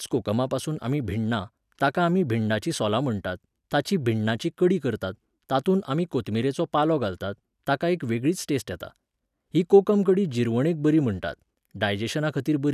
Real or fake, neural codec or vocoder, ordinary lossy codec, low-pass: real; none; none; 14.4 kHz